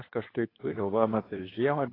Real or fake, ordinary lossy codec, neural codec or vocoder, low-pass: fake; AAC, 24 kbps; codec, 24 kHz, 0.9 kbps, WavTokenizer, medium speech release version 2; 5.4 kHz